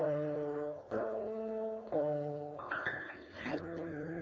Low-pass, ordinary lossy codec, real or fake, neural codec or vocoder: none; none; fake; codec, 16 kHz, 4.8 kbps, FACodec